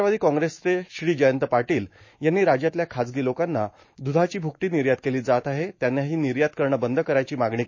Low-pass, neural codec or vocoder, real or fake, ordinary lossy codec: 7.2 kHz; autoencoder, 48 kHz, 128 numbers a frame, DAC-VAE, trained on Japanese speech; fake; MP3, 32 kbps